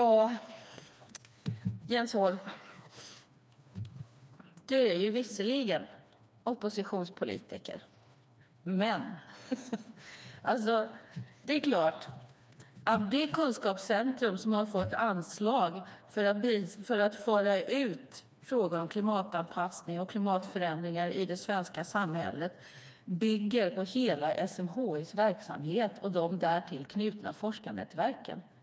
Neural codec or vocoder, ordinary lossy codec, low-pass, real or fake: codec, 16 kHz, 2 kbps, FreqCodec, smaller model; none; none; fake